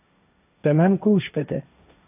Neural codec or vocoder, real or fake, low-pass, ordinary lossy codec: codec, 16 kHz, 1.1 kbps, Voila-Tokenizer; fake; 3.6 kHz; AAC, 32 kbps